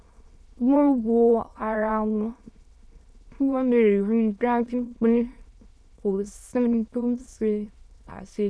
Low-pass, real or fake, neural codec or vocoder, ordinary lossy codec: none; fake; autoencoder, 22.05 kHz, a latent of 192 numbers a frame, VITS, trained on many speakers; none